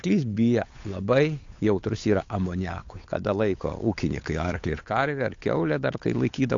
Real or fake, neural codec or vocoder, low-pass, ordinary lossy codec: fake; codec, 16 kHz, 8 kbps, FunCodec, trained on Chinese and English, 25 frames a second; 7.2 kHz; AAC, 48 kbps